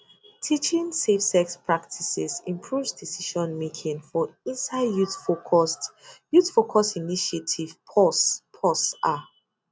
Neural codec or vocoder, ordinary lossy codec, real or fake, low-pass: none; none; real; none